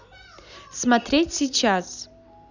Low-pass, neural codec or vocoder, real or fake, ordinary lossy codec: 7.2 kHz; none; real; none